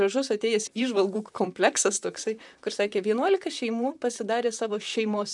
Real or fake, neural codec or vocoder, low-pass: fake; vocoder, 44.1 kHz, 128 mel bands, Pupu-Vocoder; 10.8 kHz